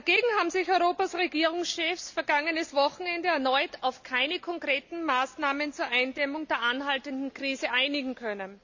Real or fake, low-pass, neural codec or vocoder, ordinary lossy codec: real; 7.2 kHz; none; none